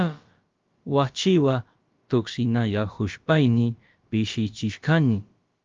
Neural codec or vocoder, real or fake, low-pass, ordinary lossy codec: codec, 16 kHz, about 1 kbps, DyCAST, with the encoder's durations; fake; 7.2 kHz; Opus, 32 kbps